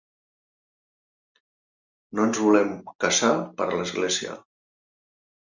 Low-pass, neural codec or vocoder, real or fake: 7.2 kHz; none; real